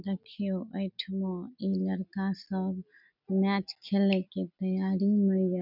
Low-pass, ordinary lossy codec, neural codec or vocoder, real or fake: 5.4 kHz; none; none; real